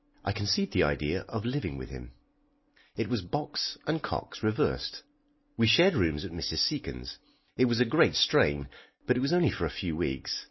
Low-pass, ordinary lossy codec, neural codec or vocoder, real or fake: 7.2 kHz; MP3, 24 kbps; none; real